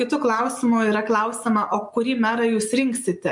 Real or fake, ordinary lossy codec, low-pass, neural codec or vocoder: real; MP3, 64 kbps; 10.8 kHz; none